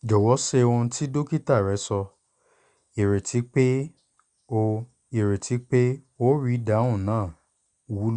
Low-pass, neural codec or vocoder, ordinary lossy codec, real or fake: 9.9 kHz; none; Opus, 64 kbps; real